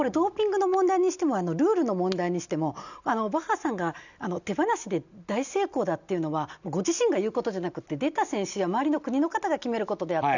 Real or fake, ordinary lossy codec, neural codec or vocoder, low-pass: real; none; none; 7.2 kHz